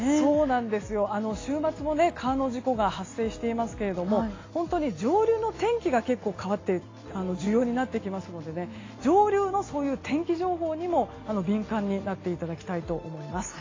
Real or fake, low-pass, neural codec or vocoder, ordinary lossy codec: real; 7.2 kHz; none; AAC, 32 kbps